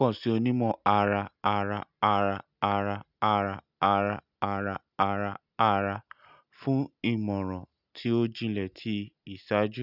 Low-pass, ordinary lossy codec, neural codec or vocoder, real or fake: 5.4 kHz; none; none; real